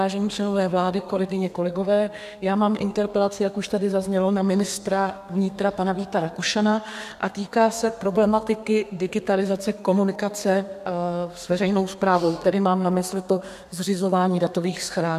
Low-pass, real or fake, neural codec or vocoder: 14.4 kHz; fake; codec, 32 kHz, 1.9 kbps, SNAC